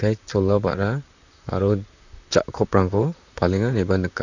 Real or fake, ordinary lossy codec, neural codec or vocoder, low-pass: fake; none; vocoder, 44.1 kHz, 128 mel bands, Pupu-Vocoder; 7.2 kHz